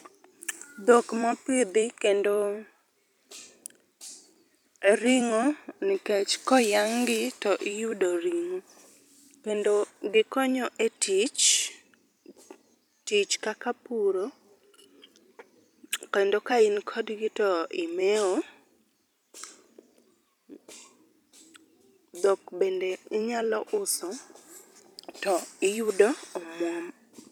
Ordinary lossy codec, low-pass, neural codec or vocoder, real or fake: none; 19.8 kHz; vocoder, 44.1 kHz, 128 mel bands every 256 samples, BigVGAN v2; fake